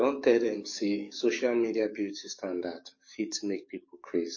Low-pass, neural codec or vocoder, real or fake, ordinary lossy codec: 7.2 kHz; codec, 44.1 kHz, 7.8 kbps, DAC; fake; MP3, 32 kbps